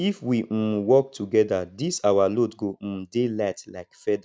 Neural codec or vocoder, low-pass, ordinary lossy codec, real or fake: none; none; none; real